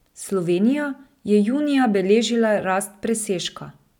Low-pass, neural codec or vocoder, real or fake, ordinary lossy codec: 19.8 kHz; none; real; none